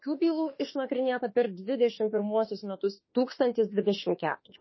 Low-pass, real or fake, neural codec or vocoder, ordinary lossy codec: 7.2 kHz; fake; autoencoder, 48 kHz, 32 numbers a frame, DAC-VAE, trained on Japanese speech; MP3, 24 kbps